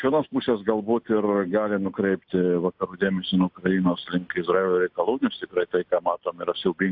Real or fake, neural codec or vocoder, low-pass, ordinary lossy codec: real; none; 5.4 kHz; AAC, 48 kbps